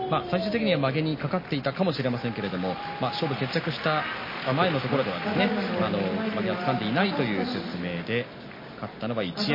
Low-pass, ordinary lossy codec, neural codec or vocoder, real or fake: 5.4 kHz; MP3, 24 kbps; none; real